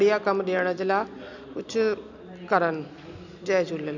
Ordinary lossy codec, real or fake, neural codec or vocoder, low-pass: none; real; none; 7.2 kHz